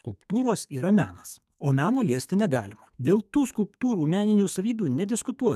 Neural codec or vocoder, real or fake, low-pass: codec, 32 kHz, 1.9 kbps, SNAC; fake; 14.4 kHz